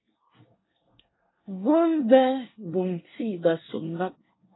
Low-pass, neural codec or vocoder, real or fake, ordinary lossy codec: 7.2 kHz; codec, 24 kHz, 1 kbps, SNAC; fake; AAC, 16 kbps